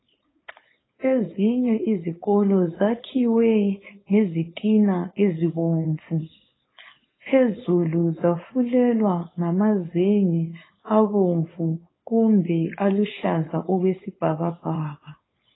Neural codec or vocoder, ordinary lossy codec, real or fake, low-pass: codec, 16 kHz, 4.8 kbps, FACodec; AAC, 16 kbps; fake; 7.2 kHz